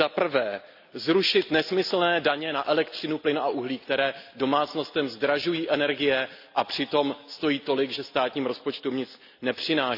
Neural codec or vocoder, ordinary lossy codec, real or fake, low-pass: none; none; real; 5.4 kHz